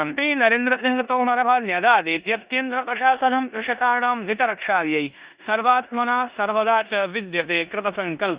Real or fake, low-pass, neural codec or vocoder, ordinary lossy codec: fake; 3.6 kHz; codec, 16 kHz in and 24 kHz out, 0.9 kbps, LongCat-Audio-Codec, four codebook decoder; Opus, 64 kbps